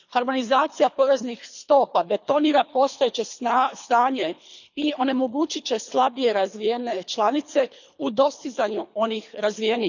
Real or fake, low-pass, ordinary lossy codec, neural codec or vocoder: fake; 7.2 kHz; none; codec, 24 kHz, 3 kbps, HILCodec